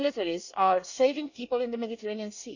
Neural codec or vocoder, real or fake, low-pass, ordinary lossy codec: codec, 24 kHz, 1 kbps, SNAC; fake; 7.2 kHz; none